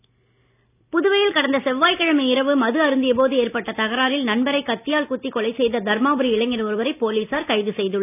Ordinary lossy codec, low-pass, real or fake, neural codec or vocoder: none; 3.6 kHz; real; none